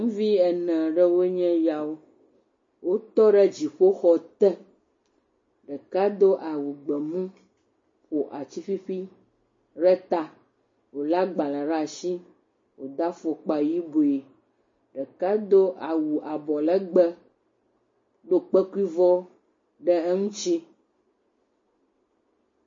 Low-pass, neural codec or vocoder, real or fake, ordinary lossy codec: 7.2 kHz; none; real; MP3, 32 kbps